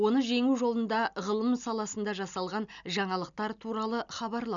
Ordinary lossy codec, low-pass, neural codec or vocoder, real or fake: none; 7.2 kHz; none; real